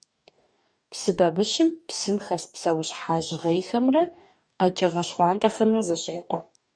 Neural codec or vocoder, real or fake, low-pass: codec, 44.1 kHz, 2.6 kbps, DAC; fake; 9.9 kHz